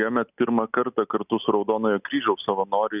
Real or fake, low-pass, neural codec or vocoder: real; 3.6 kHz; none